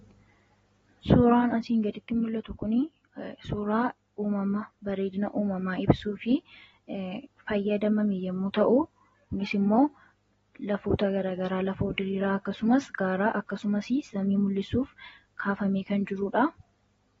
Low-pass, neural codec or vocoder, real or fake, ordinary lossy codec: 19.8 kHz; none; real; AAC, 24 kbps